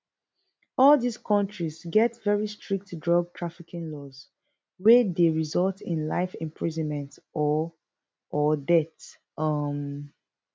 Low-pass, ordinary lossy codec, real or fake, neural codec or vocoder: none; none; real; none